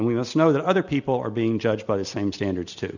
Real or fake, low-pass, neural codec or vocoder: real; 7.2 kHz; none